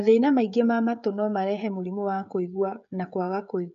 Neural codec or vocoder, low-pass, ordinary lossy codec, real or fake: codec, 16 kHz, 16 kbps, FreqCodec, smaller model; 7.2 kHz; none; fake